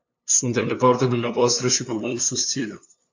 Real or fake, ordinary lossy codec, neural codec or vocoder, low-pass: fake; AAC, 48 kbps; codec, 16 kHz, 2 kbps, FunCodec, trained on LibriTTS, 25 frames a second; 7.2 kHz